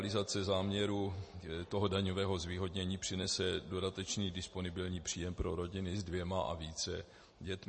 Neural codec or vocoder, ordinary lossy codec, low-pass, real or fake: none; MP3, 32 kbps; 10.8 kHz; real